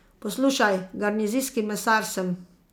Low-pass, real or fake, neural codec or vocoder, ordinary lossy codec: none; real; none; none